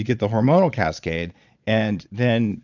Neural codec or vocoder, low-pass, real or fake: vocoder, 22.05 kHz, 80 mel bands, Vocos; 7.2 kHz; fake